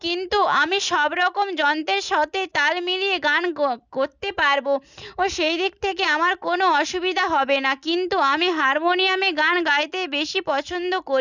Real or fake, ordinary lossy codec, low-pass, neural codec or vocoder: real; none; 7.2 kHz; none